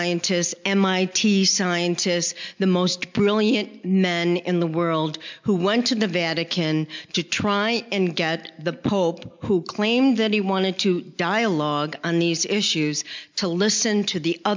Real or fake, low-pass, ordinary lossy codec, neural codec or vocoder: real; 7.2 kHz; MP3, 64 kbps; none